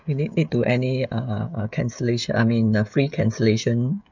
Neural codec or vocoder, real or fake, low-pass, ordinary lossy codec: codec, 16 kHz, 16 kbps, FreqCodec, smaller model; fake; 7.2 kHz; none